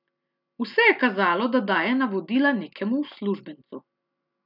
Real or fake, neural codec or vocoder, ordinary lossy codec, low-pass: real; none; none; 5.4 kHz